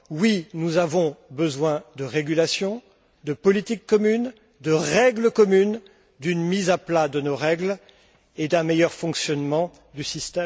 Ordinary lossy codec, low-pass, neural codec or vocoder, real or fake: none; none; none; real